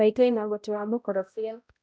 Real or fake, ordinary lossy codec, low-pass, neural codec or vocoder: fake; none; none; codec, 16 kHz, 0.5 kbps, X-Codec, HuBERT features, trained on balanced general audio